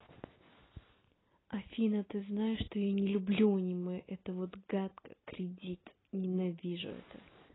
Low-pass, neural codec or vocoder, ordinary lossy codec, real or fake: 7.2 kHz; none; AAC, 16 kbps; real